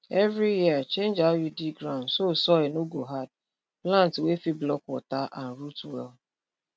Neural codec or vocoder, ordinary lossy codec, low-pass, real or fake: none; none; none; real